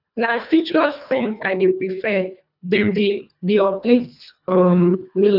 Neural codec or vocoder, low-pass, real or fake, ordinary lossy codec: codec, 24 kHz, 1.5 kbps, HILCodec; 5.4 kHz; fake; none